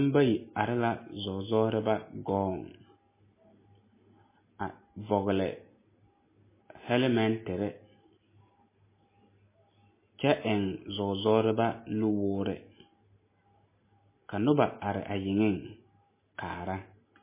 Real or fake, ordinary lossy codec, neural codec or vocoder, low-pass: real; MP3, 16 kbps; none; 3.6 kHz